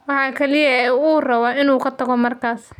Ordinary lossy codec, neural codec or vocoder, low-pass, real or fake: none; none; 19.8 kHz; real